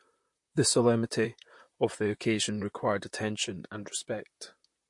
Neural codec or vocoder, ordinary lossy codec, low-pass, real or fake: vocoder, 44.1 kHz, 128 mel bands, Pupu-Vocoder; MP3, 48 kbps; 10.8 kHz; fake